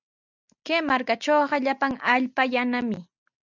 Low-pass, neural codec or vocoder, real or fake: 7.2 kHz; none; real